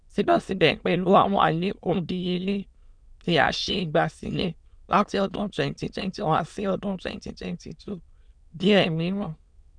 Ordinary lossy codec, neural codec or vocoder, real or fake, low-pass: none; autoencoder, 22.05 kHz, a latent of 192 numbers a frame, VITS, trained on many speakers; fake; 9.9 kHz